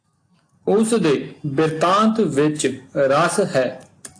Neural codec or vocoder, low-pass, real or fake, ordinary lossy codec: none; 9.9 kHz; real; AAC, 48 kbps